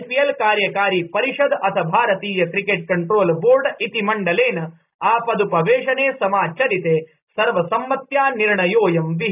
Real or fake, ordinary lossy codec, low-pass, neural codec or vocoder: real; none; 3.6 kHz; none